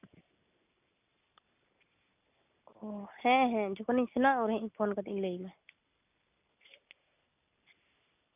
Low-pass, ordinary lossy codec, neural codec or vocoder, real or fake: 3.6 kHz; none; none; real